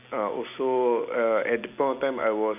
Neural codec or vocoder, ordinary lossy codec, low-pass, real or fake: none; none; 3.6 kHz; real